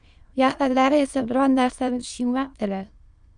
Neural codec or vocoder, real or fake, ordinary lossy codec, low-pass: autoencoder, 22.05 kHz, a latent of 192 numbers a frame, VITS, trained on many speakers; fake; Opus, 64 kbps; 9.9 kHz